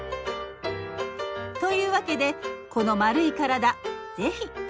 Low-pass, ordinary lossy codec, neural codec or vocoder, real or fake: none; none; none; real